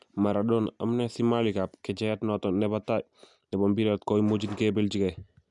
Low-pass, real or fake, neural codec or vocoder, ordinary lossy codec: 10.8 kHz; real; none; none